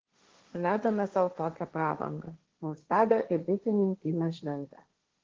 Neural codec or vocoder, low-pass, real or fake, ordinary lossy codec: codec, 16 kHz, 1.1 kbps, Voila-Tokenizer; 7.2 kHz; fake; Opus, 16 kbps